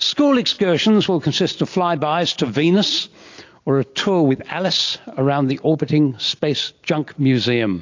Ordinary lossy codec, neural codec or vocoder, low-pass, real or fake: AAC, 48 kbps; none; 7.2 kHz; real